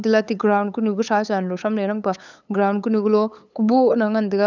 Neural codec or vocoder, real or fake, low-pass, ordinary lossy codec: codec, 16 kHz, 8 kbps, FunCodec, trained on LibriTTS, 25 frames a second; fake; 7.2 kHz; none